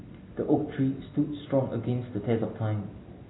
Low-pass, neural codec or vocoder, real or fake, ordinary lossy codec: 7.2 kHz; none; real; AAC, 16 kbps